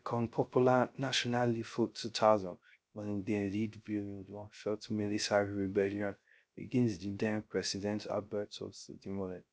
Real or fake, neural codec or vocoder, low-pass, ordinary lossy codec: fake; codec, 16 kHz, 0.3 kbps, FocalCodec; none; none